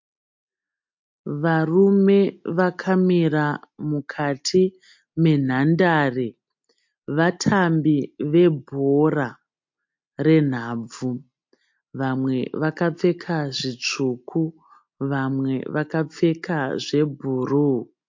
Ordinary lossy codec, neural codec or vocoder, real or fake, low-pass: MP3, 48 kbps; none; real; 7.2 kHz